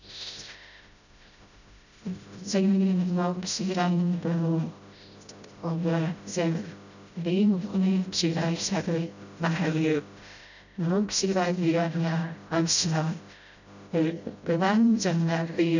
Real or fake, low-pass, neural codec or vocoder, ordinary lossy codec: fake; 7.2 kHz; codec, 16 kHz, 0.5 kbps, FreqCodec, smaller model; none